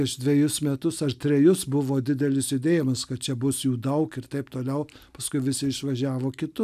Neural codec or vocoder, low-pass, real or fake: none; 14.4 kHz; real